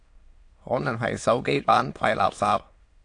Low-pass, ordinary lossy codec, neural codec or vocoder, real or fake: 9.9 kHz; AAC, 48 kbps; autoencoder, 22.05 kHz, a latent of 192 numbers a frame, VITS, trained on many speakers; fake